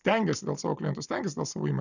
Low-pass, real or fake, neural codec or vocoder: 7.2 kHz; real; none